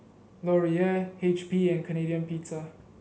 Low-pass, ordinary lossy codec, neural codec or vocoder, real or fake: none; none; none; real